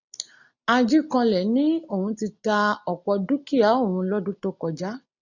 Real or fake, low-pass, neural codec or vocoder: real; 7.2 kHz; none